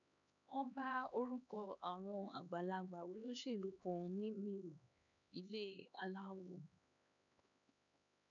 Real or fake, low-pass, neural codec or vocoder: fake; 7.2 kHz; codec, 16 kHz, 2 kbps, X-Codec, HuBERT features, trained on LibriSpeech